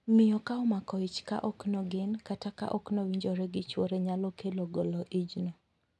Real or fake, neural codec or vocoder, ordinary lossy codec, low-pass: real; none; none; none